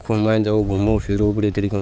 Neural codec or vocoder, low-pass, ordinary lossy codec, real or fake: codec, 16 kHz, 4 kbps, X-Codec, HuBERT features, trained on balanced general audio; none; none; fake